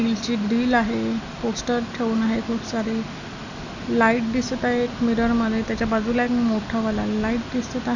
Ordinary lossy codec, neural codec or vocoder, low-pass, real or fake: none; none; 7.2 kHz; real